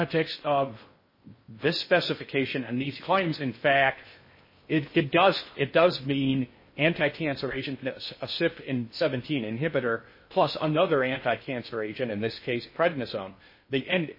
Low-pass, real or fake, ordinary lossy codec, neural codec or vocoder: 5.4 kHz; fake; MP3, 24 kbps; codec, 16 kHz in and 24 kHz out, 0.6 kbps, FocalCodec, streaming, 2048 codes